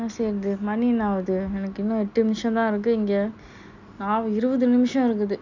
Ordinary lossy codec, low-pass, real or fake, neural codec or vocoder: none; 7.2 kHz; real; none